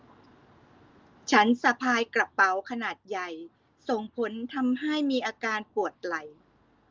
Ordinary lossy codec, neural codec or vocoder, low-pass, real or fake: Opus, 24 kbps; none; 7.2 kHz; real